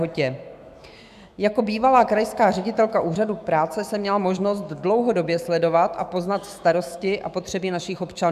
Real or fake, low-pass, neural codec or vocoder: fake; 14.4 kHz; autoencoder, 48 kHz, 128 numbers a frame, DAC-VAE, trained on Japanese speech